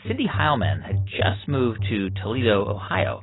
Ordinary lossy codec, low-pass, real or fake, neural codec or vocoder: AAC, 16 kbps; 7.2 kHz; real; none